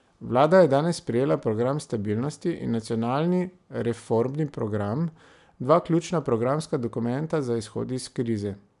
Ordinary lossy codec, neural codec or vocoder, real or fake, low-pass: none; none; real; 10.8 kHz